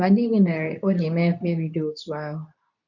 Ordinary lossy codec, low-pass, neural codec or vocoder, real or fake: none; 7.2 kHz; codec, 24 kHz, 0.9 kbps, WavTokenizer, medium speech release version 2; fake